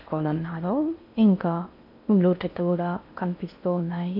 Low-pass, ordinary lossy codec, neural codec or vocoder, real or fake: 5.4 kHz; none; codec, 16 kHz in and 24 kHz out, 0.6 kbps, FocalCodec, streaming, 4096 codes; fake